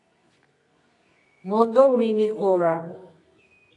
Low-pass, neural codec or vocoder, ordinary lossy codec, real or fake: 10.8 kHz; codec, 24 kHz, 0.9 kbps, WavTokenizer, medium music audio release; AAC, 48 kbps; fake